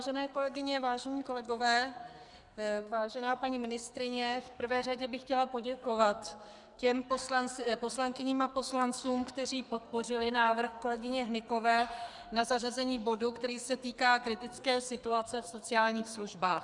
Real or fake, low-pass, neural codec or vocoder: fake; 10.8 kHz; codec, 44.1 kHz, 2.6 kbps, SNAC